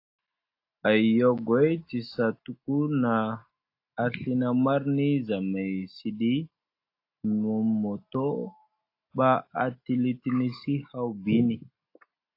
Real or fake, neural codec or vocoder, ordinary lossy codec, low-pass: real; none; AAC, 32 kbps; 5.4 kHz